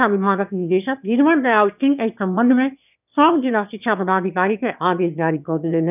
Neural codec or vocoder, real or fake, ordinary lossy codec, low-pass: autoencoder, 22.05 kHz, a latent of 192 numbers a frame, VITS, trained on one speaker; fake; none; 3.6 kHz